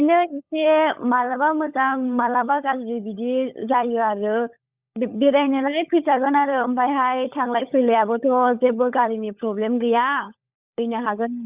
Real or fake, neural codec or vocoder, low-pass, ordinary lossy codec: fake; codec, 16 kHz, 16 kbps, FunCodec, trained on LibriTTS, 50 frames a second; 3.6 kHz; Opus, 64 kbps